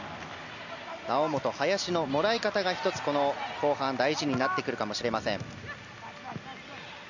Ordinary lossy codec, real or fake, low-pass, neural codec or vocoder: none; real; 7.2 kHz; none